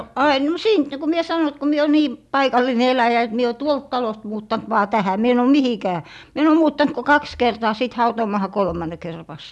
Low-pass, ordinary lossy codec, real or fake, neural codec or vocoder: none; none; real; none